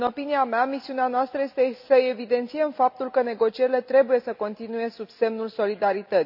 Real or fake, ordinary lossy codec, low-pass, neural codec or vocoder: real; AAC, 48 kbps; 5.4 kHz; none